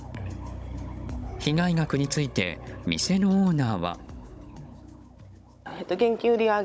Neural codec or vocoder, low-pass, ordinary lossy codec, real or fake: codec, 16 kHz, 16 kbps, FunCodec, trained on Chinese and English, 50 frames a second; none; none; fake